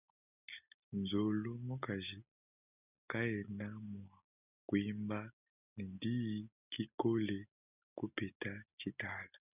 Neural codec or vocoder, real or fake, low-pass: none; real; 3.6 kHz